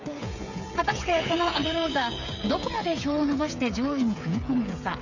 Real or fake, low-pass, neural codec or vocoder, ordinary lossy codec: fake; 7.2 kHz; codec, 16 kHz, 8 kbps, FreqCodec, smaller model; none